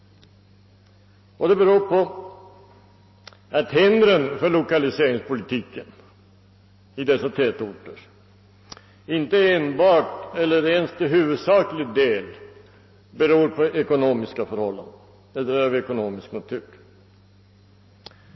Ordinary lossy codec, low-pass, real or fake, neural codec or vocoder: MP3, 24 kbps; 7.2 kHz; real; none